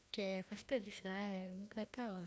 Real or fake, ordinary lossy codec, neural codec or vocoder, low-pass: fake; none; codec, 16 kHz, 1 kbps, FreqCodec, larger model; none